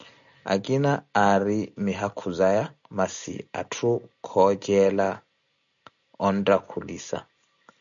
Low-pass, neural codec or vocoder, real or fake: 7.2 kHz; none; real